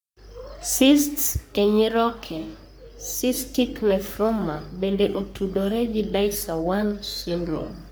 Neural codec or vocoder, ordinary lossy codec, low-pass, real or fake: codec, 44.1 kHz, 3.4 kbps, Pupu-Codec; none; none; fake